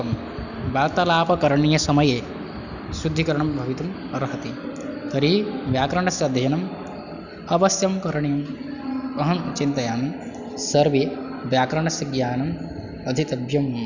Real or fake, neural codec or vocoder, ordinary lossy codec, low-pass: real; none; none; 7.2 kHz